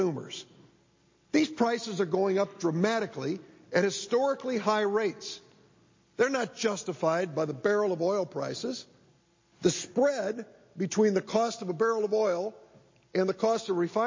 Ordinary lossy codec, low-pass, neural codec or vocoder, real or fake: MP3, 32 kbps; 7.2 kHz; none; real